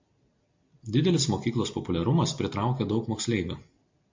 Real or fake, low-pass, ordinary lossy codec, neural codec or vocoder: real; 7.2 kHz; MP3, 48 kbps; none